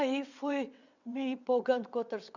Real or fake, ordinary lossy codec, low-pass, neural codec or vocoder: fake; none; 7.2 kHz; vocoder, 22.05 kHz, 80 mel bands, WaveNeXt